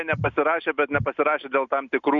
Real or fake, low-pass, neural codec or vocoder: real; 7.2 kHz; none